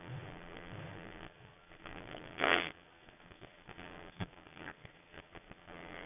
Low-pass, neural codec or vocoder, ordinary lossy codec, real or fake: 3.6 kHz; vocoder, 22.05 kHz, 80 mel bands, Vocos; none; fake